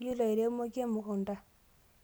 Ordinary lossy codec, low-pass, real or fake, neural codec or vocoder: none; none; real; none